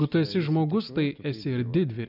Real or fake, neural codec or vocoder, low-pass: real; none; 5.4 kHz